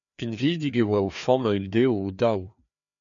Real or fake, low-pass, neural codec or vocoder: fake; 7.2 kHz; codec, 16 kHz, 2 kbps, FreqCodec, larger model